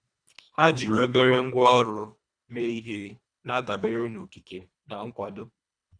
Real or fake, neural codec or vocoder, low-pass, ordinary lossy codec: fake; codec, 24 kHz, 1.5 kbps, HILCodec; 9.9 kHz; none